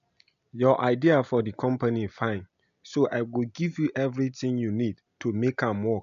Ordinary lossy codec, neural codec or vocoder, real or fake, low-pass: none; none; real; 7.2 kHz